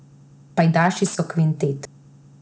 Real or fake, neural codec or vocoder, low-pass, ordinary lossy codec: real; none; none; none